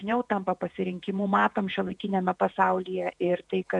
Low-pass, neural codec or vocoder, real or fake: 10.8 kHz; vocoder, 48 kHz, 128 mel bands, Vocos; fake